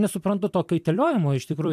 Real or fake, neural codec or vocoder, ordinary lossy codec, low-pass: fake; vocoder, 44.1 kHz, 128 mel bands every 256 samples, BigVGAN v2; AAC, 96 kbps; 14.4 kHz